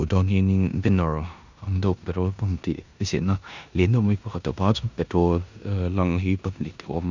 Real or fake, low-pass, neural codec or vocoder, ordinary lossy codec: fake; 7.2 kHz; codec, 16 kHz in and 24 kHz out, 0.9 kbps, LongCat-Audio-Codec, four codebook decoder; none